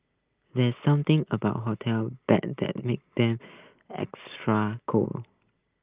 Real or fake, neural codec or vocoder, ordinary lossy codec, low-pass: real; none; Opus, 32 kbps; 3.6 kHz